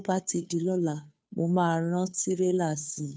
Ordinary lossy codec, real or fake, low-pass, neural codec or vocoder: none; fake; none; codec, 16 kHz, 2 kbps, FunCodec, trained on Chinese and English, 25 frames a second